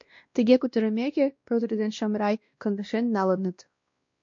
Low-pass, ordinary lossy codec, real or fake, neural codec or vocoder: 7.2 kHz; MP3, 48 kbps; fake; codec, 16 kHz, 1 kbps, X-Codec, WavLM features, trained on Multilingual LibriSpeech